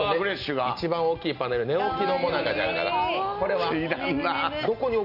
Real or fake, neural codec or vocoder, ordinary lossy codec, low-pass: real; none; none; 5.4 kHz